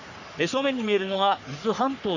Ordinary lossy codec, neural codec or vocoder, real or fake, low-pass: none; codec, 44.1 kHz, 3.4 kbps, Pupu-Codec; fake; 7.2 kHz